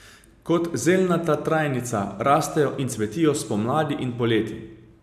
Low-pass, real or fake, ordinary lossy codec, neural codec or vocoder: 14.4 kHz; real; AAC, 96 kbps; none